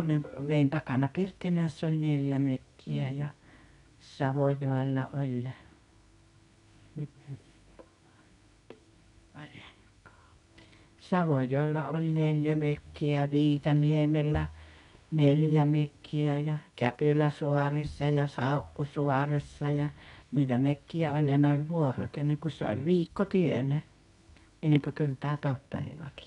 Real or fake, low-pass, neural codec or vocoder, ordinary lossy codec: fake; 10.8 kHz; codec, 24 kHz, 0.9 kbps, WavTokenizer, medium music audio release; none